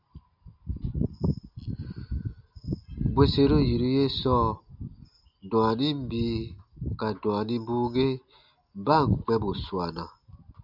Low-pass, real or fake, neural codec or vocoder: 5.4 kHz; real; none